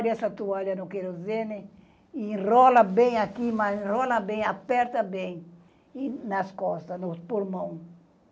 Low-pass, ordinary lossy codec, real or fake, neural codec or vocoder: none; none; real; none